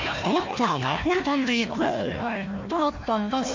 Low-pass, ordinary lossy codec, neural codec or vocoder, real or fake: 7.2 kHz; MP3, 48 kbps; codec, 16 kHz, 1 kbps, FunCodec, trained on Chinese and English, 50 frames a second; fake